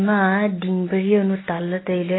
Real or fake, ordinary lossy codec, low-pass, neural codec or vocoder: real; AAC, 16 kbps; 7.2 kHz; none